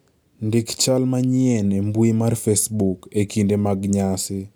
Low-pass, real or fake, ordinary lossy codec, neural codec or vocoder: none; real; none; none